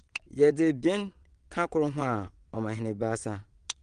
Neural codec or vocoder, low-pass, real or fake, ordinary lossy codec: vocoder, 22.05 kHz, 80 mel bands, WaveNeXt; 9.9 kHz; fake; Opus, 24 kbps